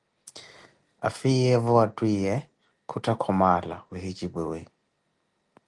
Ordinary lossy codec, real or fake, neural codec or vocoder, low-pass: Opus, 32 kbps; real; none; 10.8 kHz